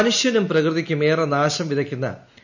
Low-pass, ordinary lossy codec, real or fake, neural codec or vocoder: 7.2 kHz; none; real; none